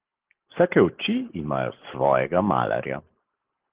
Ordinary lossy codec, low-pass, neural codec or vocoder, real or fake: Opus, 16 kbps; 3.6 kHz; none; real